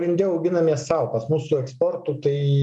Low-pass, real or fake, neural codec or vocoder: 10.8 kHz; real; none